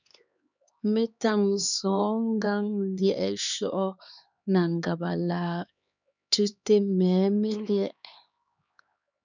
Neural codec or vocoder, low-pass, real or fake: codec, 16 kHz, 2 kbps, X-Codec, HuBERT features, trained on LibriSpeech; 7.2 kHz; fake